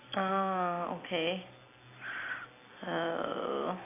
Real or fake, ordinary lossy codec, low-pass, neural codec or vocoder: real; AAC, 16 kbps; 3.6 kHz; none